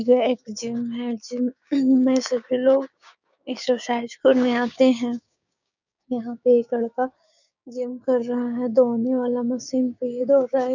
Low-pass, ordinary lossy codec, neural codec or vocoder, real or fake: 7.2 kHz; none; codec, 16 kHz, 6 kbps, DAC; fake